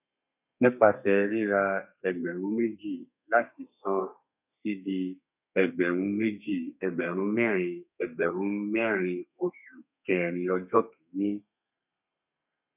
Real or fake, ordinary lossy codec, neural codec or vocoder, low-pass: fake; none; codec, 32 kHz, 1.9 kbps, SNAC; 3.6 kHz